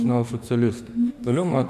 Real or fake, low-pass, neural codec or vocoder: fake; 14.4 kHz; autoencoder, 48 kHz, 32 numbers a frame, DAC-VAE, trained on Japanese speech